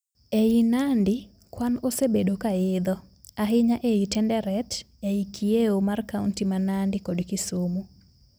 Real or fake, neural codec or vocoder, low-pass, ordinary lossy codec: real; none; none; none